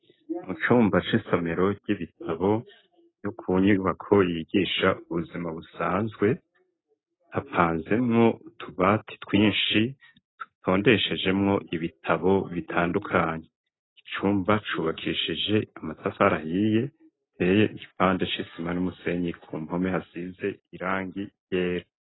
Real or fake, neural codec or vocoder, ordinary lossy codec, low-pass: real; none; AAC, 16 kbps; 7.2 kHz